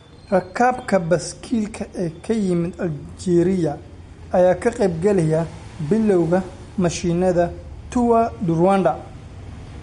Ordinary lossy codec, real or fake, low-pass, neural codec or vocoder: MP3, 48 kbps; real; 19.8 kHz; none